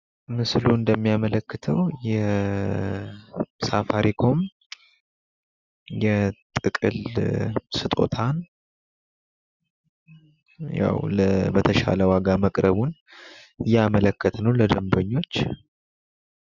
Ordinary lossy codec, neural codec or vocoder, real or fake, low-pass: Opus, 64 kbps; none; real; 7.2 kHz